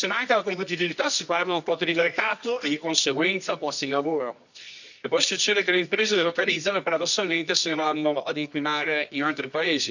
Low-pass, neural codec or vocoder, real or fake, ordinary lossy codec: 7.2 kHz; codec, 24 kHz, 0.9 kbps, WavTokenizer, medium music audio release; fake; none